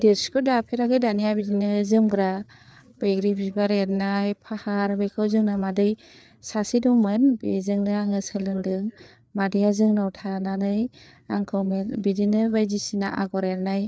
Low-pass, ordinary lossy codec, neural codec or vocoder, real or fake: none; none; codec, 16 kHz, 4 kbps, FreqCodec, larger model; fake